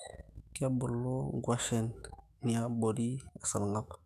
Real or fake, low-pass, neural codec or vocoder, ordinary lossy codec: fake; 14.4 kHz; autoencoder, 48 kHz, 128 numbers a frame, DAC-VAE, trained on Japanese speech; none